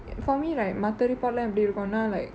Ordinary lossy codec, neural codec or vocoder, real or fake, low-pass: none; none; real; none